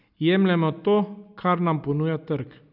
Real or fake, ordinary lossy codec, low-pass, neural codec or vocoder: real; none; 5.4 kHz; none